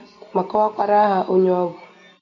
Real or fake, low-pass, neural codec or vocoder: real; 7.2 kHz; none